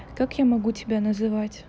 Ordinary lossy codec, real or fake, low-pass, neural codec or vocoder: none; real; none; none